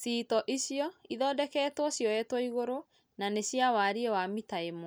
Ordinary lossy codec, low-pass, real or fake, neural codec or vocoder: none; none; real; none